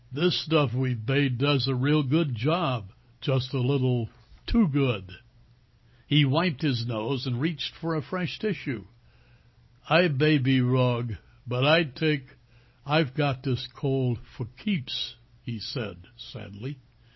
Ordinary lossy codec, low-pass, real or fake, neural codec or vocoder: MP3, 24 kbps; 7.2 kHz; real; none